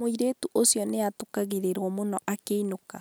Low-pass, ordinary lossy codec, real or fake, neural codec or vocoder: none; none; real; none